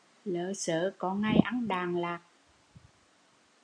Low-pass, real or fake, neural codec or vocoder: 9.9 kHz; real; none